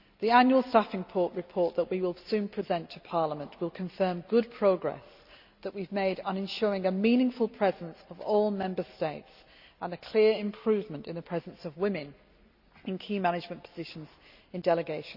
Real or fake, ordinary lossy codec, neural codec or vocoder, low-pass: real; Opus, 64 kbps; none; 5.4 kHz